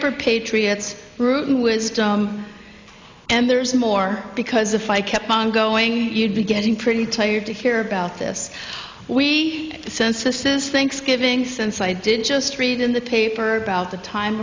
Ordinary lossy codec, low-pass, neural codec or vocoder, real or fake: MP3, 64 kbps; 7.2 kHz; none; real